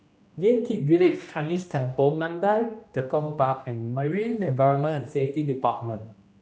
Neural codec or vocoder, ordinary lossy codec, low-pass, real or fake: codec, 16 kHz, 1 kbps, X-Codec, HuBERT features, trained on general audio; none; none; fake